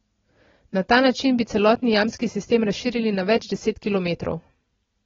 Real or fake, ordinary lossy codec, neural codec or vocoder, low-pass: real; AAC, 24 kbps; none; 7.2 kHz